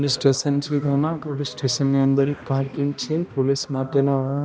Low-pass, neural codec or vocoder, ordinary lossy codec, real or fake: none; codec, 16 kHz, 1 kbps, X-Codec, HuBERT features, trained on balanced general audio; none; fake